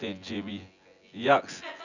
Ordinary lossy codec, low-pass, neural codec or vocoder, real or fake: none; 7.2 kHz; vocoder, 24 kHz, 100 mel bands, Vocos; fake